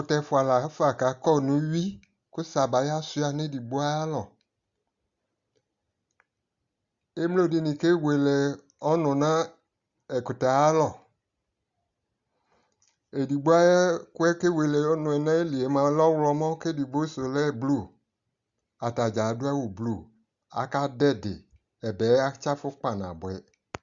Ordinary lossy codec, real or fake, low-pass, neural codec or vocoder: Opus, 64 kbps; real; 7.2 kHz; none